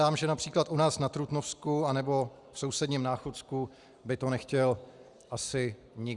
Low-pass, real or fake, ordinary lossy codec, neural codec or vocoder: 10.8 kHz; real; Opus, 64 kbps; none